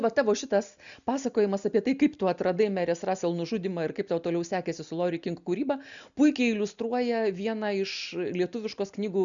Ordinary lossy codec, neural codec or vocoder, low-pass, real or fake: MP3, 96 kbps; none; 7.2 kHz; real